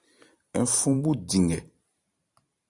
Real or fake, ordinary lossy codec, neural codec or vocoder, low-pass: fake; Opus, 64 kbps; vocoder, 44.1 kHz, 128 mel bands every 512 samples, BigVGAN v2; 10.8 kHz